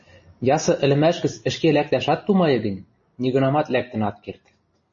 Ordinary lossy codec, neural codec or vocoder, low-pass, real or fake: MP3, 32 kbps; none; 7.2 kHz; real